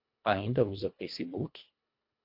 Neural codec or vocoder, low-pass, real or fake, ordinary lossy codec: codec, 24 kHz, 1.5 kbps, HILCodec; 5.4 kHz; fake; MP3, 48 kbps